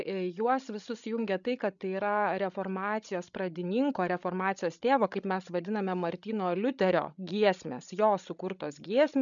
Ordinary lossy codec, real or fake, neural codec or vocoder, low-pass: MP3, 96 kbps; fake; codec, 16 kHz, 16 kbps, FreqCodec, larger model; 7.2 kHz